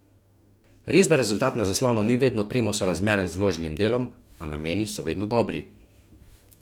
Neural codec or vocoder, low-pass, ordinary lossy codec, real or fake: codec, 44.1 kHz, 2.6 kbps, DAC; 19.8 kHz; none; fake